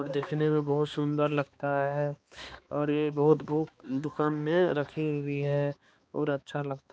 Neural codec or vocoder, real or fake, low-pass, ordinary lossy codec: codec, 16 kHz, 2 kbps, X-Codec, HuBERT features, trained on balanced general audio; fake; none; none